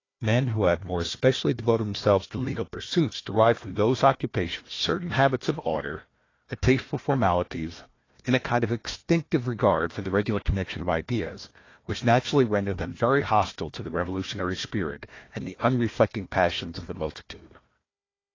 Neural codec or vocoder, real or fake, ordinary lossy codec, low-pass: codec, 16 kHz, 1 kbps, FunCodec, trained on Chinese and English, 50 frames a second; fake; AAC, 32 kbps; 7.2 kHz